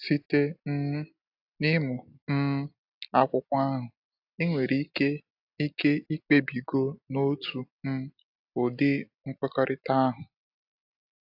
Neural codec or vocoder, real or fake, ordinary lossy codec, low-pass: none; real; AAC, 48 kbps; 5.4 kHz